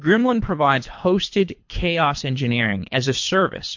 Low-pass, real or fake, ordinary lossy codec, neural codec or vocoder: 7.2 kHz; fake; MP3, 48 kbps; codec, 24 kHz, 3 kbps, HILCodec